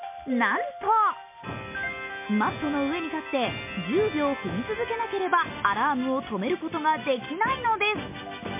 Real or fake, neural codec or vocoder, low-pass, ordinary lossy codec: real; none; 3.6 kHz; MP3, 24 kbps